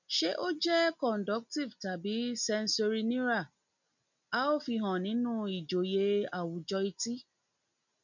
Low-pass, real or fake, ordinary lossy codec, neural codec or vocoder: 7.2 kHz; real; none; none